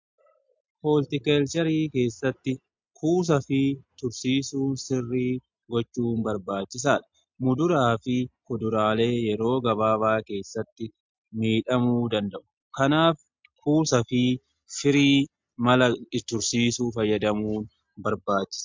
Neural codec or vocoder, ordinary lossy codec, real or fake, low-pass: none; MP3, 64 kbps; real; 7.2 kHz